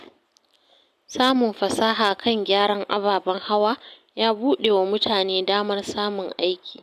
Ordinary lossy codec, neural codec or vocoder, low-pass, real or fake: none; none; 14.4 kHz; real